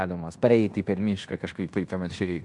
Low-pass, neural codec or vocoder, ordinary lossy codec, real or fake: 10.8 kHz; codec, 16 kHz in and 24 kHz out, 0.9 kbps, LongCat-Audio-Codec, fine tuned four codebook decoder; AAC, 64 kbps; fake